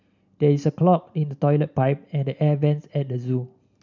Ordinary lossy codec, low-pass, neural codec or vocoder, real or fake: none; 7.2 kHz; none; real